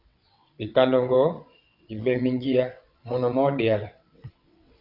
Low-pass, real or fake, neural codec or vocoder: 5.4 kHz; fake; vocoder, 22.05 kHz, 80 mel bands, WaveNeXt